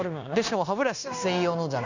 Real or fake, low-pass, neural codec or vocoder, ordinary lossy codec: fake; 7.2 kHz; codec, 16 kHz, 0.9 kbps, LongCat-Audio-Codec; none